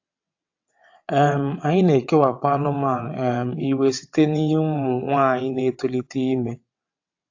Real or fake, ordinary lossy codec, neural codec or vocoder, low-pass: fake; AAC, 48 kbps; vocoder, 22.05 kHz, 80 mel bands, WaveNeXt; 7.2 kHz